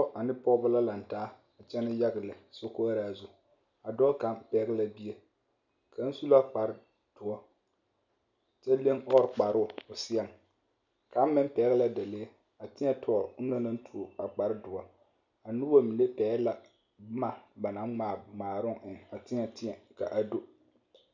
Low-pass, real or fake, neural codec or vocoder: 7.2 kHz; real; none